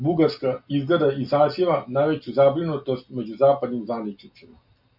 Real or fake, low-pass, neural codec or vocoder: real; 5.4 kHz; none